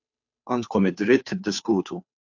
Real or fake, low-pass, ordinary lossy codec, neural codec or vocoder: fake; 7.2 kHz; AAC, 48 kbps; codec, 16 kHz, 2 kbps, FunCodec, trained on Chinese and English, 25 frames a second